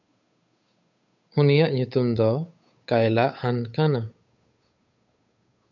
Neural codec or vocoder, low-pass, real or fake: codec, 16 kHz, 8 kbps, FunCodec, trained on Chinese and English, 25 frames a second; 7.2 kHz; fake